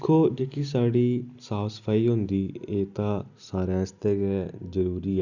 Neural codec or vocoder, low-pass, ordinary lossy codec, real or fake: none; 7.2 kHz; none; real